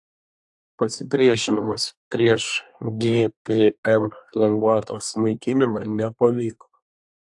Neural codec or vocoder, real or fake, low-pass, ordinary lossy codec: codec, 24 kHz, 1 kbps, SNAC; fake; 10.8 kHz; AAC, 64 kbps